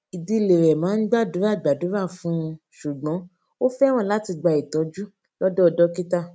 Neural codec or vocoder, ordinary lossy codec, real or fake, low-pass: none; none; real; none